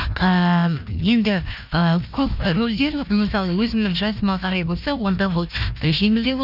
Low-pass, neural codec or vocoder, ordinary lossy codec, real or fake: 5.4 kHz; codec, 16 kHz, 1 kbps, FunCodec, trained on Chinese and English, 50 frames a second; none; fake